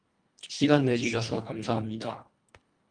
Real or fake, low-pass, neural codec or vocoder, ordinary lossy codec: fake; 9.9 kHz; codec, 24 kHz, 1.5 kbps, HILCodec; Opus, 32 kbps